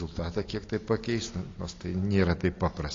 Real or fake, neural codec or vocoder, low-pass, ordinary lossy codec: real; none; 7.2 kHz; AAC, 32 kbps